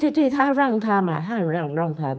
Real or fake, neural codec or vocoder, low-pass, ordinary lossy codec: fake; codec, 16 kHz, 4 kbps, X-Codec, HuBERT features, trained on general audio; none; none